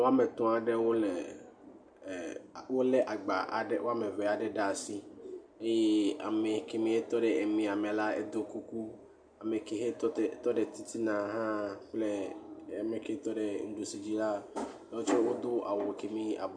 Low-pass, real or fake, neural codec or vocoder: 9.9 kHz; real; none